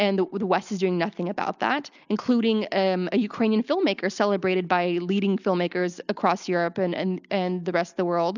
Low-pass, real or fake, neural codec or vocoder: 7.2 kHz; real; none